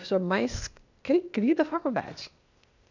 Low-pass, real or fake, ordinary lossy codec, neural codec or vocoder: 7.2 kHz; fake; none; codec, 16 kHz, 0.8 kbps, ZipCodec